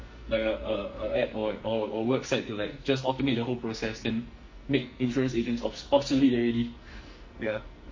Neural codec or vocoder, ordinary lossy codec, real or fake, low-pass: codec, 44.1 kHz, 2.6 kbps, SNAC; MP3, 32 kbps; fake; 7.2 kHz